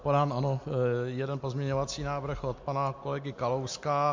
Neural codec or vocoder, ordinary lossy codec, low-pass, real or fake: none; MP3, 48 kbps; 7.2 kHz; real